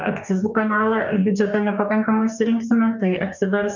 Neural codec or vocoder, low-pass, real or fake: codec, 44.1 kHz, 2.6 kbps, DAC; 7.2 kHz; fake